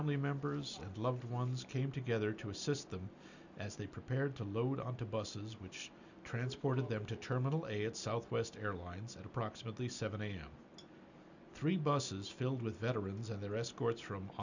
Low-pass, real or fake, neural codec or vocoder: 7.2 kHz; real; none